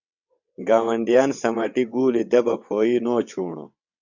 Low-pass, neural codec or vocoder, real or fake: 7.2 kHz; vocoder, 22.05 kHz, 80 mel bands, WaveNeXt; fake